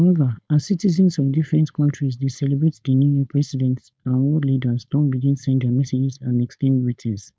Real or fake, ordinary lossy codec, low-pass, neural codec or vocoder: fake; none; none; codec, 16 kHz, 4.8 kbps, FACodec